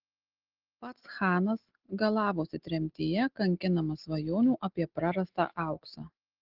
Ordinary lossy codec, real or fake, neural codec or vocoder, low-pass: Opus, 16 kbps; real; none; 5.4 kHz